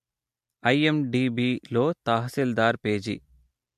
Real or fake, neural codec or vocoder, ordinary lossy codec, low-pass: real; none; MP3, 64 kbps; 14.4 kHz